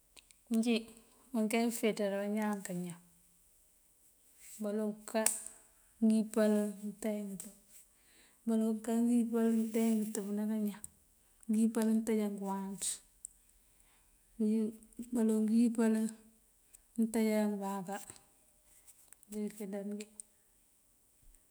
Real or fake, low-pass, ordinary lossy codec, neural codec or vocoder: fake; none; none; autoencoder, 48 kHz, 128 numbers a frame, DAC-VAE, trained on Japanese speech